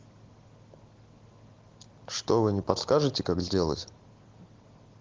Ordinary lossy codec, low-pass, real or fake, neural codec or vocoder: Opus, 16 kbps; 7.2 kHz; real; none